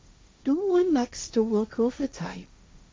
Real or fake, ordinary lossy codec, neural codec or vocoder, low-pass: fake; none; codec, 16 kHz, 1.1 kbps, Voila-Tokenizer; none